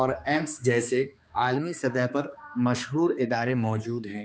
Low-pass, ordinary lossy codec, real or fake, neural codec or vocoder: none; none; fake; codec, 16 kHz, 2 kbps, X-Codec, HuBERT features, trained on balanced general audio